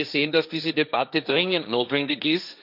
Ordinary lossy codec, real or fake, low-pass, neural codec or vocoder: none; fake; 5.4 kHz; codec, 16 kHz, 1.1 kbps, Voila-Tokenizer